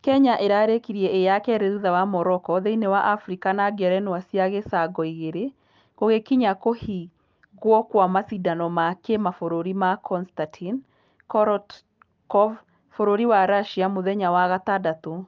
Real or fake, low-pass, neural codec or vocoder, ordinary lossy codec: real; 7.2 kHz; none; Opus, 24 kbps